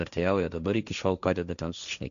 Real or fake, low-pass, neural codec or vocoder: fake; 7.2 kHz; codec, 16 kHz, 1.1 kbps, Voila-Tokenizer